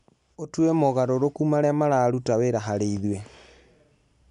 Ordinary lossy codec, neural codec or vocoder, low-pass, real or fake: none; none; 10.8 kHz; real